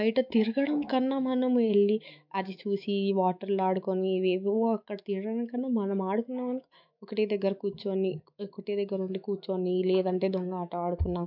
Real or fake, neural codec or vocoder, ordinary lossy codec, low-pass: fake; autoencoder, 48 kHz, 128 numbers a frame, DAC-VAE, trained on Japanese speech; MP3, 48 kbps; 5.4 kHz